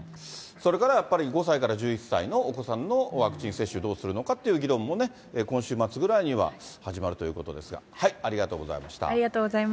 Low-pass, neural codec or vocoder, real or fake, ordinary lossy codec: none; none; real; none